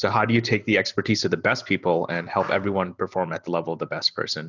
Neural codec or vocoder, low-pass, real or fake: none; 7.2 kHz; real